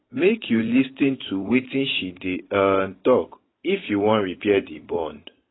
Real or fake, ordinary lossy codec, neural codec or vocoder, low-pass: fake; AAC, 16 kbps; vocoder, 44.1 kHz, 128 mel bands every 512 samples, BigVGAN v2; 7.2 kHz